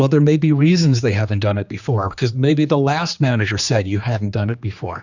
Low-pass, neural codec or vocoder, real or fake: 7.2 kHz; codec, 16 kHz, 2 kbps, X-Codec, HuBERT features, trained on general audio; fake